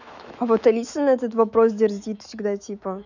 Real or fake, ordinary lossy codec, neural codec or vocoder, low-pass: fake; none; autoencoder, 48 kHz, 128 numbers a frame, DAC-VAE, trained on Japanese speech; 7.2 kHz